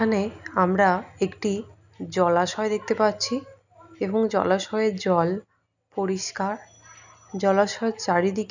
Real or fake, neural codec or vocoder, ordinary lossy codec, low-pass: real; none; none; 7.2 kHz